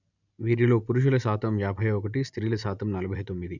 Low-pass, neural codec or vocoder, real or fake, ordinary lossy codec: 7.2 kHz; none; real; none